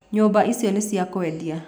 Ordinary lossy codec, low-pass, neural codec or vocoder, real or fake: none; none; none; real